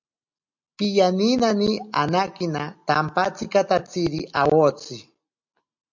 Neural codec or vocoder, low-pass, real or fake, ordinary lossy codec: none; 7.2 kHz; real; MP3, 64 kbps